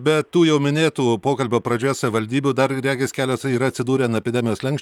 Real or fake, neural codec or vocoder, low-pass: fake; vocoder, 44.1 kHz, 128 mel bands, Pupu-Vocoder; 19.8 kHz